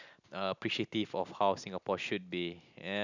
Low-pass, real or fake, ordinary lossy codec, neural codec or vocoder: 7.2 kHz; real; none; none